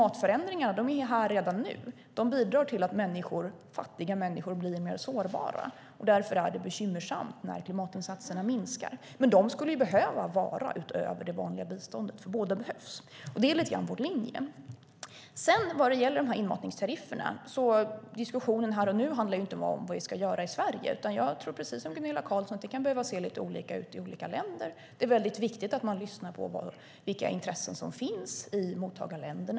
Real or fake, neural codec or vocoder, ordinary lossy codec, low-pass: real; none; none; none